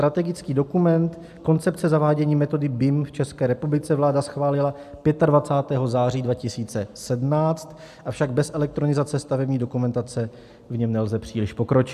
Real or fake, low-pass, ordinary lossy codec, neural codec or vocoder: real; 14.4 kHz; AAC, 96 kbps; none